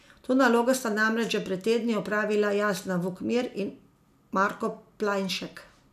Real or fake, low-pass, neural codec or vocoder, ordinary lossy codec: real; 14.4 kHz; none; none